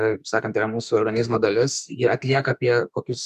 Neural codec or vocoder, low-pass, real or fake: autoencoder, 48 kHz, 32 numbers a frame, DAC-VAE, trained on Japanese speech; 14.4 kHz; fake